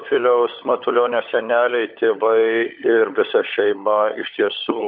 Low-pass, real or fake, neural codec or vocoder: 5.4 kHz; fake; codec, 16 kHz, 16 kbps, FunCodec, trained on LibriTTS, 50 frames a second